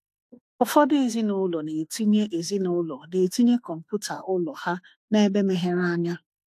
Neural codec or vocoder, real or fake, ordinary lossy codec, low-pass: autoencoder, 48 kHz, 32 numbers a frame, DAC-VAE, trained on Japanese speech; fake; AAC, 64 kbps; 14.4 kHz